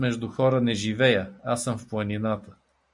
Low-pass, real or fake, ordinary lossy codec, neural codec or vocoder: 10.8 kHz; real; MP3, 64 kbps; none